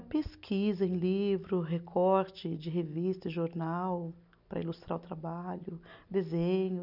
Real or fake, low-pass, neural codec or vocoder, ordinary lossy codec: real; 5.4 kHz; none; none